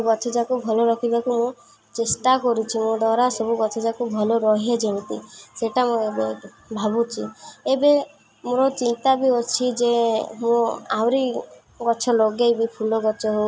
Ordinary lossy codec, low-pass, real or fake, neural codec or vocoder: none; none; real; none